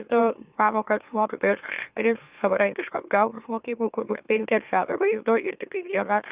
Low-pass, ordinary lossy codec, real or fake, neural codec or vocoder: 3.6 kHz; Opus, 64 kbps; fake; autoencoder, 44.1 kHz, a latent of 192 numbers a frame, MeloTTS